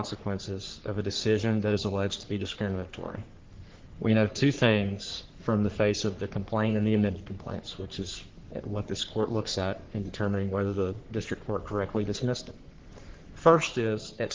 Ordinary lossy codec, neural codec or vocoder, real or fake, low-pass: Opus, 16 kbps; codec, 44.1 kHz, 3.4 kbps, Pupu-Codec; fake; 7.2 kHz